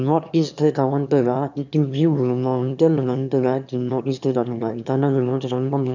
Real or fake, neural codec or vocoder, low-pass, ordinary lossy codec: fake; autoencoder, 22.05 kHz, a latent of 192 numbers a frame, VITS, trained on one speaker; 7.2 kHz; none